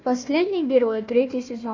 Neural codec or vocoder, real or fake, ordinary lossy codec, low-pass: codec, 16 kHz, 1 kbps, FunCodec, trained on Chinese and English, 50 frames a second; fake; MP3, 64 kbps; 7.2 kHz